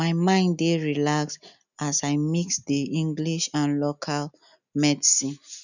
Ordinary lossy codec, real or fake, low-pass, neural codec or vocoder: none; real; 7.2 kHz; none